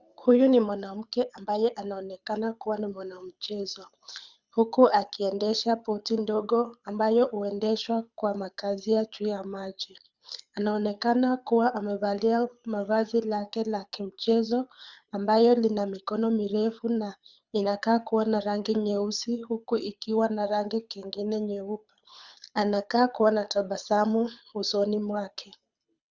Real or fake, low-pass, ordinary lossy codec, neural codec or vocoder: fake; 7.2 kHz; Opus, 64 kbps; codec, 24 kHz, 6 kbps, HILCodec